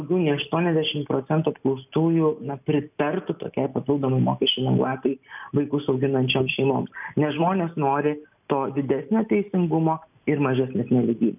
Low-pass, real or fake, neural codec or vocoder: 3.6 kHz; real; none